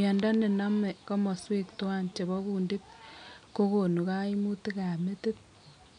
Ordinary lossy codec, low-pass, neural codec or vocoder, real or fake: none; 9.9 kHz; none; real